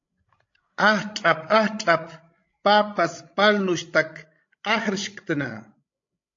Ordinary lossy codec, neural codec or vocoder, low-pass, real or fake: AAC, 48 kbps; codec, 16 kHz, 16 kbps, FreqCodec, larger model; 7.2 kHz; fake